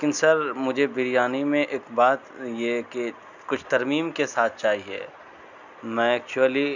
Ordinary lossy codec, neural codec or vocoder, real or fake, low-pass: none; none; real; 7.2 kHz